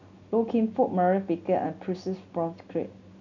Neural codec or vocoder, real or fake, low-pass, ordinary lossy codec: none; real; 7.2 kHz; none